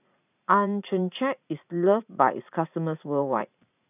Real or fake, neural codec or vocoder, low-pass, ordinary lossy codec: fake; vocoder, 44.1 kHz, 80 mel bands, Vocos; 3.6 kHz; none